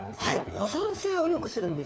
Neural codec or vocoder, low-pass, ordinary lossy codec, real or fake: codec, 16 kHz, 4 kbps, FunCodec, trained on LibriTTS, 50 frames a second; none; none; fake